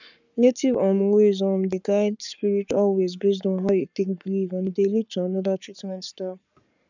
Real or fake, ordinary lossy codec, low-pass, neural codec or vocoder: fake; none; 7.2 kHz; codec, 44.1 kHz, 7.8 kbps, Pupu-Codec